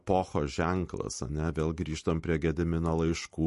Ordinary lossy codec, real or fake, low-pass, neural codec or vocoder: MP3, 48 kbps; real; 14.4 kHz; none